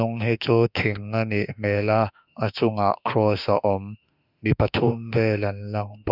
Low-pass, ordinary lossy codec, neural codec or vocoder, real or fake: 5.4 kHz; none; autoencoder, 48 kHz, 32 numbers a frame, DAC-VAE, trained on Japanese speech; fake